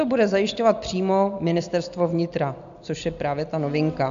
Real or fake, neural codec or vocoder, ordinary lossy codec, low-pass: real; none; MP3, 64 kbps; 7.2 kHz